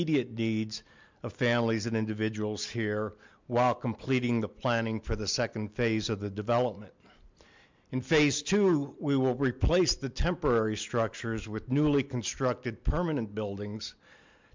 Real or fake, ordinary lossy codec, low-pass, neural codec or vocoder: real; MP3, 64 kbps; 7.2 kHz; none